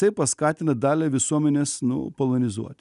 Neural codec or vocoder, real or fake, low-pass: none; real; 10.8 kHz